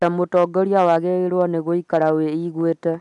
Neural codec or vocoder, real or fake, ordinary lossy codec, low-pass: none; real; MP3, 96 kbps; 10.8 kHz